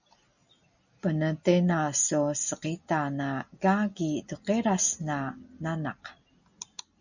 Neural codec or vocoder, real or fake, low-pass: none; real; 7.2 kHz